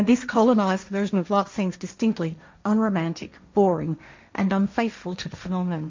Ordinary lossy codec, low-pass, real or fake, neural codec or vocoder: MP3, 64 kbps; 7.2 kHz; fake; codec, 16 kHz, 1.1 kbps, Voila-Tokenizer